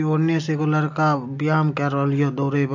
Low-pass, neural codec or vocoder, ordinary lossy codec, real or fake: 7.2 kHz; none; MP3, 48 kbps; real